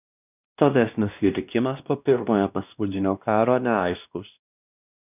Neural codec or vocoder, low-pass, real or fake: codec, 16 kHz, 1 kbps, X-Codec, WavLM features, trained on Multilingual LibriSpeech; 3.6 kHz; fake